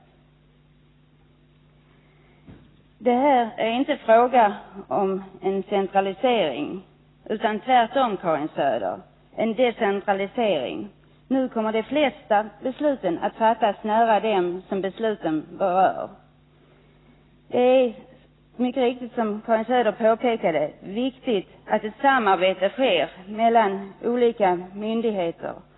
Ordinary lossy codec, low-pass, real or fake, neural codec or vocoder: AAC, 16 kbps; 7.2 kHz; real; none